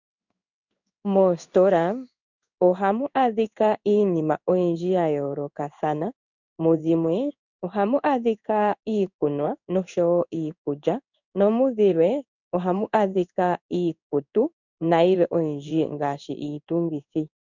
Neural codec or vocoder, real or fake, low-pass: codec, 16 kHz in and 24 kHz out, 1 kbps, XY-Tokenizer; fake; 7.2 kHz